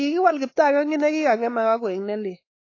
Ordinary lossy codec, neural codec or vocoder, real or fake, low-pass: AAC, 32 kbps; codec, 16 kHz, 4 kbps, X-Codec, WavLM features, trained on Multilingual LibriSpeech; fake; 7.2 kHz